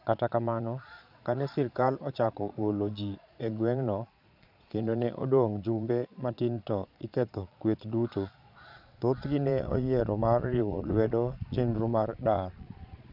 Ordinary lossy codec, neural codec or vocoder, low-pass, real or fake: none; vocoder, 22.05 kHz, 80 mel bands, Vocos; 5.4 kHz; fake